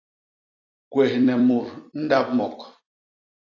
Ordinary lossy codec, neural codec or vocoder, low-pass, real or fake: AAC, 32 kbps; vocoder, 44.1 kHz, 128 mel bands every 256 samples, BigVGAN v2; 7.2 kHz; fake